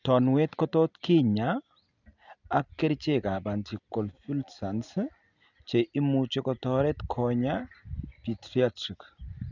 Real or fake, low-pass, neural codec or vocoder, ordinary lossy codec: real; 7.2 kHz; none; none